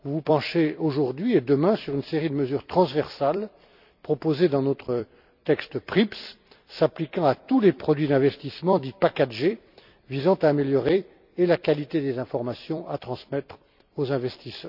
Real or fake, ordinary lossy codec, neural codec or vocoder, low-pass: fake; none; vocoder, 44.1 kHz, 128 mel bands every 256 samples, BigVGAN v2; 5.4 kHz